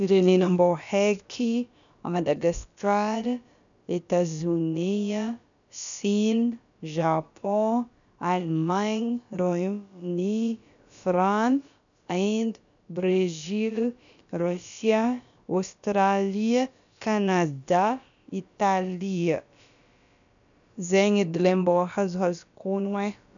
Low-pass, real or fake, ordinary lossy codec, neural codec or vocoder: 7.2 kHz; fake; none; codec, 16 kHz, about 1 kbps, DyCAST, with the encoder's durations